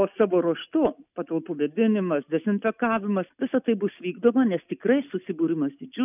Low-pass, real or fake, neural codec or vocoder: 3.6 kHz; real; none